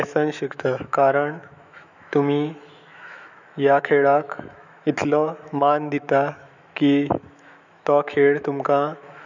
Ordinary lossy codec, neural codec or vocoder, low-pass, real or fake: none; autoencoder, 48 kHz, 128 numbers a frame, DAC-VAE, trained on Japanese speech; 7.2 kHz; fake